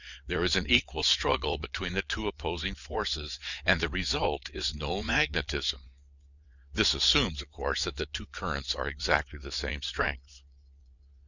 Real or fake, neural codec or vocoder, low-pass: fake; vocoder, 22.05 kHz, 80 mel bands, WaveNeXt; 7.2 kHz